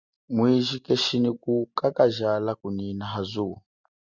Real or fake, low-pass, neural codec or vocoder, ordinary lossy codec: real; 7.2 kHz; none; Opus, 64 kbps